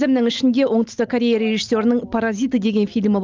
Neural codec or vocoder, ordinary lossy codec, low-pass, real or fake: none; Opus, 24 kbps; 7.2 kHz; real